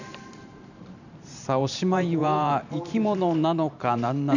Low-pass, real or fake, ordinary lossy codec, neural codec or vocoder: 7.2 kHz; fake; none; vocoder, 44.1 kHz, 128 mel bands every 512 samples, BigVGAN v2